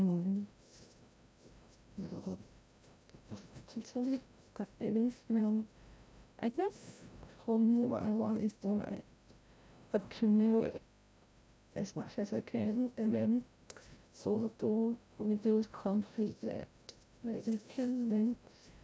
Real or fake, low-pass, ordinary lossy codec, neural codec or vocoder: fake; none; none; codec, 16 kHz, 0.5 kbps, FreqCodec, larger model